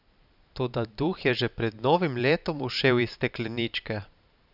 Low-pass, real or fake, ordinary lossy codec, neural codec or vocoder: 5.4 kHz; fake; none; vocoder, 44.1 kHz, 128 mel bands every 512 samples, BigVGAN v2